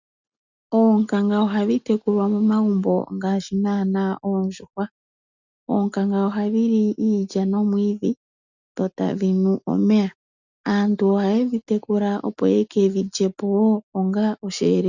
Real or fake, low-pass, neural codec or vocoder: real; 7.2 kHz; none